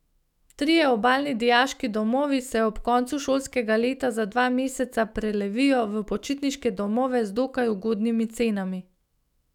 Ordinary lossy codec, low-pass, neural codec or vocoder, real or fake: none; 19.8 kHz; autoencoder, 48 kHz, 128 numbers a frame, DAC-VAE, trained on Japanese speech; fake